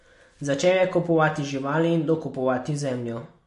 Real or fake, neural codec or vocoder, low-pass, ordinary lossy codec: real; none; 14.4 kHz; MP3, 48 kbps